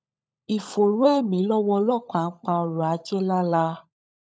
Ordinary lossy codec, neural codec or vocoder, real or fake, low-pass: none; codec, 16 kHz, 16 kbps, FunCodec, trained on LibriTTS, 50 frames a second; fake; none